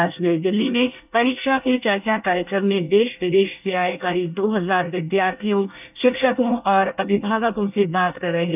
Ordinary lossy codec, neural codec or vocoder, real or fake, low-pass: none; codec, 24 kHz, 1 kbps, SNAC; fake; 3.6 kHz